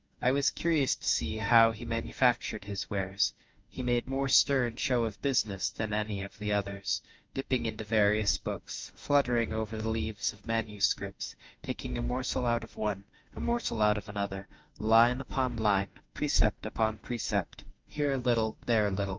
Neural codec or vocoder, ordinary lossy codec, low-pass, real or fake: autoencoder, 48 kHz, 32 numbers a frame, DAC-VAE, trained on Japanese speech; Opus, 32 kbps; 7.2 kHz; fake